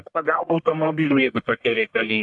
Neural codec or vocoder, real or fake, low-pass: codec, 44.1 kHz, 1.7 kbps, Pupu-Codec; fake; 10.8 kHz